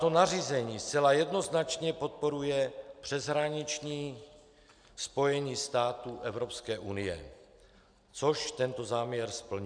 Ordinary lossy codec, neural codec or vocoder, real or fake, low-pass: MP3, 96 kbps; none; real; 9.9 kHz